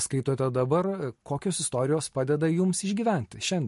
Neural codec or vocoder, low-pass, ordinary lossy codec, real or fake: none; 14.4 kHz; MP3, 48 kbps; real